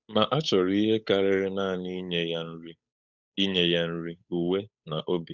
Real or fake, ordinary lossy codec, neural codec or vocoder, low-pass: fake; none; codec, 16 kHz, 8 kbps, FunCodec, trained on Chinese and English, 25 frames a second; 7.2 kHz